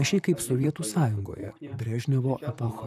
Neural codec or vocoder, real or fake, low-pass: vocoder, 44.1 kHz, 128 mel bands, Pupu-Vocoder; fake; 14.4 kHz